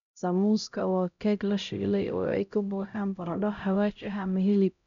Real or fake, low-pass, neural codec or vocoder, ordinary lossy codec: fake; 7.2 kHz; codec, 16 kHz, 0.5 kbps, X-Codec, HuBERT features, trained on LibriSpeech; none